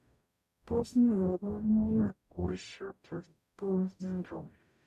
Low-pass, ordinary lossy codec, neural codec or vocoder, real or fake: 14.4 kHz; none; codec, 44.1 kHz, 0.9 kbps, DAC; fake